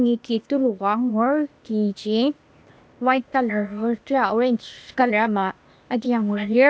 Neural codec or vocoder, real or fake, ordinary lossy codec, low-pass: codec, 16 kHz, 0.8 kbps, ZipCodec; fake; none; none